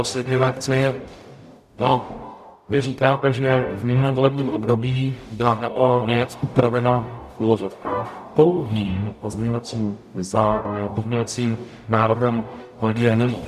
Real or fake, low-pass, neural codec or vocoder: fake; 14.4 kHz; codec, 44.1 kHz, 0.9 kbps, DAC